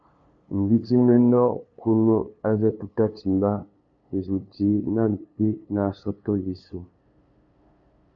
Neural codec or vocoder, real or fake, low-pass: codec, 16 kHz, 2 kbps, FunCodec, trained on LibriTTS, 25 frames a second; fake; 7.2 kHz